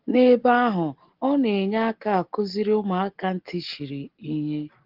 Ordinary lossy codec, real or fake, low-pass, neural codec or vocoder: Opus, 16 kbps; real; 5.4 kHz; none